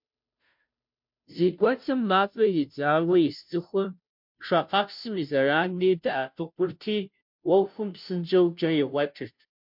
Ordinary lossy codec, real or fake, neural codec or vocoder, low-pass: MP3, 48 kbps; fake; codec, 16 kHz, 0.5 kbps, FunCodec, trained on Chinese and English, 25 frames a second; 5.4 kHz